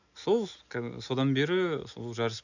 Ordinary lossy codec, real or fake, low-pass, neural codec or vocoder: none; real; 7.2 kHz; none